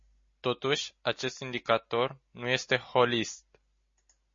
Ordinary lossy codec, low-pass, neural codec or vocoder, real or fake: MP3, 32 kbps; 7.2 kHz; none; real